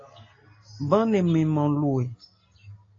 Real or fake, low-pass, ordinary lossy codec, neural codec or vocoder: real; 7.2 kHz; AAC, 32 kbps; none